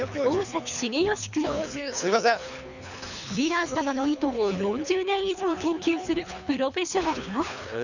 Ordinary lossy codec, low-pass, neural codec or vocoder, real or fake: none; 7.2 kHz; codec, 24 kHz, 3 kbps, HILCodec; fake